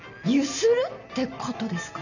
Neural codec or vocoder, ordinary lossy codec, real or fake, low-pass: none; none; real; 7.2 kHz